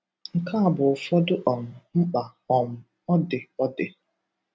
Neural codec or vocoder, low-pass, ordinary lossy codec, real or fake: none; none; none; real